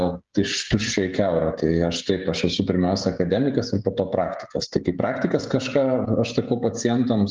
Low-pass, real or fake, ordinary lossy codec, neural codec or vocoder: 7.2 kHz; fake; Opus, 24 kbps; codec, 16 kHz, 16 kbps, FreqCodec, smaller model